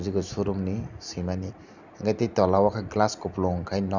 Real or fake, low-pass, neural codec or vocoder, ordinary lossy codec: real; 7.2 kHz; none; none